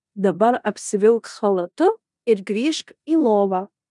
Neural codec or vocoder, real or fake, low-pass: codec, 16 kHz in and 24 kHz out, 0.9 kbps, LongCat-Audio-Codec, four codebook decoder; fake; 10.8 kHz